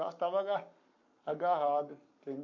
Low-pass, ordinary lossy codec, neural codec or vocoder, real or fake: 7.2 kHz; MP3, 48 kbps; codec, 44.1 kHz, 7.8 kbps, Pupu-Codec; fake